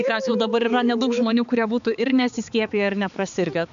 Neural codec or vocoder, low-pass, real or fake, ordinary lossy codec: codec, 16 kHz, 4 kbps, X-Codec, HuBERT features, trained on balanced general audio; 7.2 kHz; fake; AAC, 96 kbps